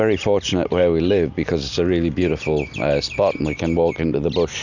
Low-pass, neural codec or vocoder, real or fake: 7.2 kHz; none; real